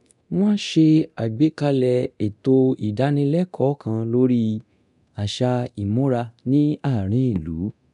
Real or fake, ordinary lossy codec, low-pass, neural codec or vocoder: fake; none; 10.8 kHz; codec, 24 kHz, 0.9 kbps, DualCodec